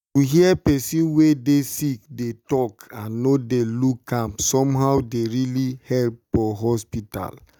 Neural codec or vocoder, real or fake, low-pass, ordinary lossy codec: none; real; none; none